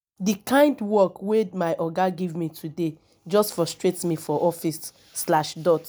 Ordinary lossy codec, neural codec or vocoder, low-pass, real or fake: none; none; none; real